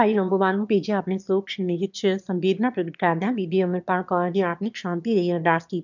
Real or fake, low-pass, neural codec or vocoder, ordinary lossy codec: fake; 7.2 kHz; autoencoder, 22.05 kHz, a latent of 192 numbers a frame, VITS, trained on one speaker; none